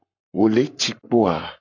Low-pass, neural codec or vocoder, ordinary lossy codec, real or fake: 7.2 kHz; codec, 44.1 kHz, 7.8 kbps, Pupu-Codec; AAC, 48 kbps; fake